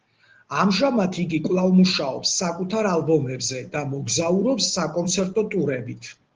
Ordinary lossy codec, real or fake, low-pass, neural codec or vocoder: Opus, 16 kbps; real; 7.2 kHz; none